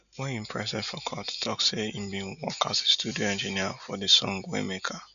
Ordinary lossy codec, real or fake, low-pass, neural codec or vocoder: none; real; 7.2 kHz; none